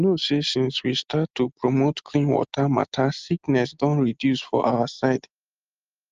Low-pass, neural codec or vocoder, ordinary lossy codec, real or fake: 7.2 kHz; none; Opus, 24 kbps; real